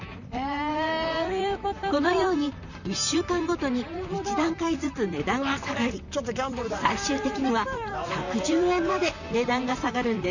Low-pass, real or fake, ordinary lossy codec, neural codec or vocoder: 7.2 kHz; fake; none; vocoder, 44.1 kHz, 128 mel bands, Pupu-Vocoder